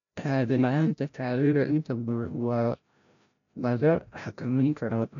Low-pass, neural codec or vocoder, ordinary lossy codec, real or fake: 7.2 kHz; codec, 16 kHz, 0.5 kbps, FreqCodec, larger model; none; fake